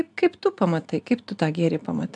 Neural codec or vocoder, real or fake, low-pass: none; real; 10.8 kHz